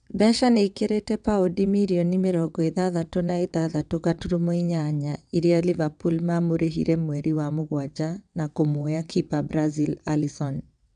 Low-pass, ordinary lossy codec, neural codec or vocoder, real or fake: 9.9 kHz; none; vocoder, 22.05 kHz, 80 mel bands, WaveNeXt; fake